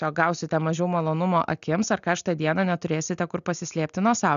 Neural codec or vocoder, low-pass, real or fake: none; 7.2 kHz; real